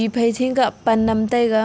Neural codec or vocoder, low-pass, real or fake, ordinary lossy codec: none; none; real; none